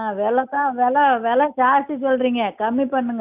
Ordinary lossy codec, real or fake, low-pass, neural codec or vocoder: none; real; 3.6 kHz; none